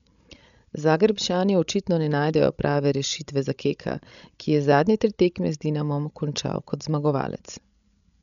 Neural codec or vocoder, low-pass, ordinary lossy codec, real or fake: codec, 16 kHz, 16 kbps, FreqCodec, larger model; 7.2 kHz; none; fake